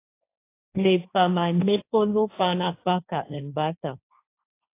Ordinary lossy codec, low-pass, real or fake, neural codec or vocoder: AAC, 24 kbps; 3.6 kHz; fake; codec, 16 kHz, 1.1 kbps, Voila-Tokenizer